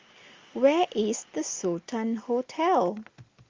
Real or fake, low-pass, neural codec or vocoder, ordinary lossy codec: real; 7.2 kHz; none; Opus, 32 kbps